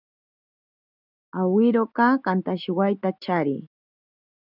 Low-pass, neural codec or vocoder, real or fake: 5.4 kHz; none; real